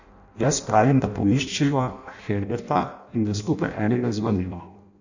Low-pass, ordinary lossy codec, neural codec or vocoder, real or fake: 7.2 kHz; none; codec, 16 kHz in and 24 kHz out, 0.6 kbps, FireRedTTS-2 codec; fake